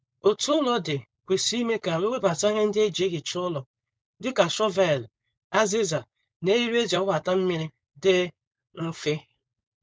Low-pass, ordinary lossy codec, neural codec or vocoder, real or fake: none; none; codec, 16 kHz, 4.8 kbps, FACodec; fake